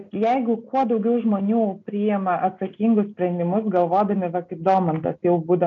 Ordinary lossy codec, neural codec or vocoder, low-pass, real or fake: MP3, 64 kbps; none; 7.2 kHz; real